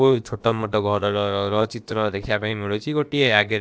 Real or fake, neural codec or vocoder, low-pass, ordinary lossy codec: fake; codec, 16 kHz, about 1 kbps, DyCAST, with the encoder's durations; none; none